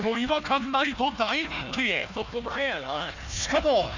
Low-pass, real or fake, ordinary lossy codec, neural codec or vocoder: 7.2 kHz; fake; none; codec, 16 kHz, 1 kbps, FunCodec, trained on Chinese and English, 50 frames a second